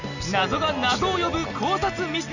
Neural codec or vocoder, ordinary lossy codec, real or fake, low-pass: none; none; real; 7.2 kHz